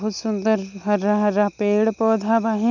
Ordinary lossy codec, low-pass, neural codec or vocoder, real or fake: none; 7.2 kHz; none; real